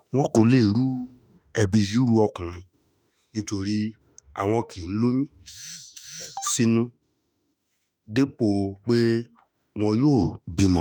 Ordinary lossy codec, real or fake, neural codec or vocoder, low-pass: none; fake; autoencoder, 48 kHz, 32 numbers a frame, DAC-VAE, trained on Japanese speech; none